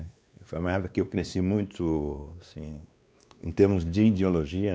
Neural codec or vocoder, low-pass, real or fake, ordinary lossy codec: codec, 16 kHz, 2 kbps, X-Codec, WavLM features, trained on Multilingual LibriSpeech; none; fake; none